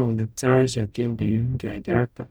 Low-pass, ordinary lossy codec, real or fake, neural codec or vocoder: none; none; fake; codec, 44.1 kHz, 0.9 kbps, DAC